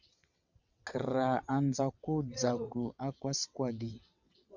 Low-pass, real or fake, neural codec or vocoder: 7.2 kHz; fake; vocoder, 22.05 kHz, 80 mel bands, WaveNeXt